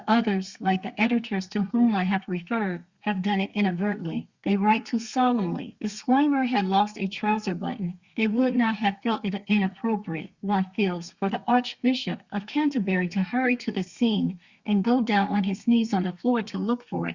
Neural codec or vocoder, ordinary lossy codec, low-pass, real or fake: codec, 32 kHz, 1.9 kbps, SNAC; Opus, 64 kbps; 7.2 kHz; fake